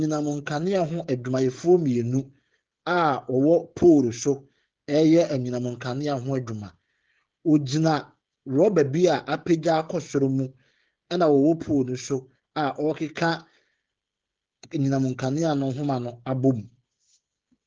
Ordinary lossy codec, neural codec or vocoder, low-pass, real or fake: Opus, 16 kbps; codec, 16 kHz, 16 kbps, FreqCodec, smaller model; 7.2 kHz; fake